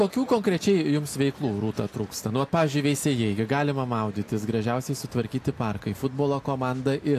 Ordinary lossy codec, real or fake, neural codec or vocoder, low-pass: AAC, 64 kbps; real; none; 14.4 kHz